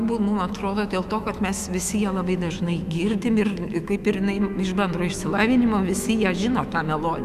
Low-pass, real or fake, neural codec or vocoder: 14.4 kHz; fake; autoencoder, 48 kHz, 128 numbers a frame, DAC-VAE, trained on Japanese speech